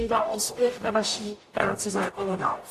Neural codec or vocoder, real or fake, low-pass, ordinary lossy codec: codec, 44.1 kHz, 0.9 kbps, DAC; fake; 14.4 kHz; AAC, 64 kbps